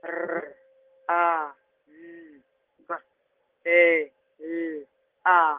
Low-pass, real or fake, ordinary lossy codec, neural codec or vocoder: 3.6 kHz; real; Opus, 16 kbps; none